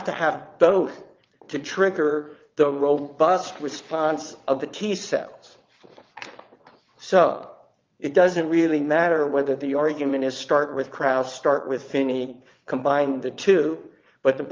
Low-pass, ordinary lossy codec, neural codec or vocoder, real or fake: 7.2 kHz; Opus, 24 kbps; vocoder, 22.05 kHz, 80 mel bands, WaveNeXt; fake